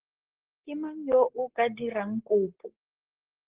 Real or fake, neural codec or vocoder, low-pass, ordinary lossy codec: fake; codec, 44.1 kHz, 7.8 kbps, Pupu-Codec; 3.6 kHz; Opus, 16 kbps